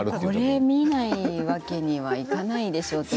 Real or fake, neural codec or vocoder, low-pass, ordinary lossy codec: real; none; none; none